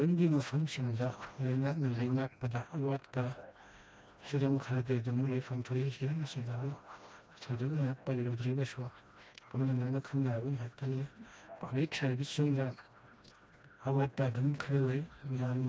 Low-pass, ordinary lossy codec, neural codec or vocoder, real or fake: none; none; codec, 16 kHz, 1 kbps, FreqCodec, smaller model; fake